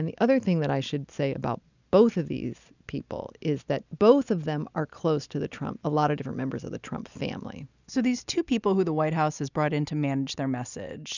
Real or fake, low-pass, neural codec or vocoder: real; 7.2 kHz; none